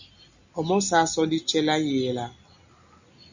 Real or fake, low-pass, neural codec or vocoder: real; 7.2 kHz; none